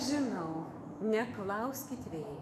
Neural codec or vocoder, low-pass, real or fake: autoencoder, 48 kHz, 128 numbers a frame, DAC-VAE, trained on Japanese speech; 14.4 kHz; fake